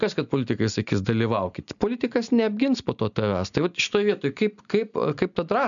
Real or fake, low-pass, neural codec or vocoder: real; 7.2 kHz; none